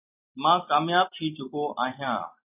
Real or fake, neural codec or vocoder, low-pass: real; none; 3.6 kHz